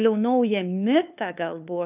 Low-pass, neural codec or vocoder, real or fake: 3.6 kHz; codec, 16 kHz, 2 kbps, FunCodec, trained on LibriTTS, 25 frames a second; fake